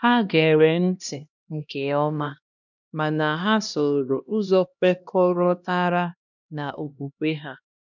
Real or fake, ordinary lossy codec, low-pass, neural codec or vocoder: fake; none; 7.2 kHz; codec, 16 kHz, 1 kbps, X-Codec, HuBERT features, trained on LibriSpeech